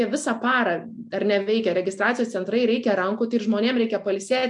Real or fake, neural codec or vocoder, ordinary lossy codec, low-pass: real; none; MP3, 64 kbps; 10.8 kHz